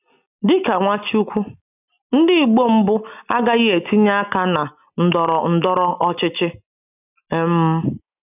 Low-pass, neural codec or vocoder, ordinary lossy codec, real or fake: 3.6 kHz; none; none; real